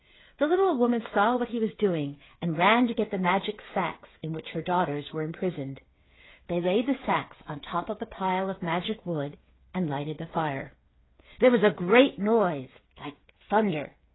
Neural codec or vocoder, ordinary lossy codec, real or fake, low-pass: codec, 16 kHz, 8 kbps, FreqCodec, smaller model; AAC, 16 kbps; fake; 7.2 kHz